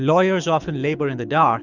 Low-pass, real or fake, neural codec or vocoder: 7.2 kHz; fake; codec, 24 kHz, 6 kbps, HILCodec